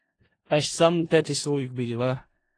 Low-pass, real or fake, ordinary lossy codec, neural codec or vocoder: 9.9 kHz; fake; AAC, 32 kbps; codec, 16 kHz in and 24 kHz out, 0.4 kbps, LongCat-Audio-Codec, four codebook decoder